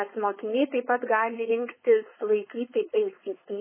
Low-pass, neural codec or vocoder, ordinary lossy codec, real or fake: 3.6 kHz; codec, 16 kHz, 4.8 kbps, FACodec; MP3, 16 kbps; fake